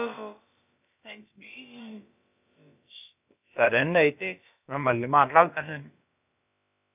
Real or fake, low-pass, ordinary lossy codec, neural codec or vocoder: fake; 3.6 kHz; none; codec, 16 kHz, about 1 kbps, DyCAST, with the encoder's durations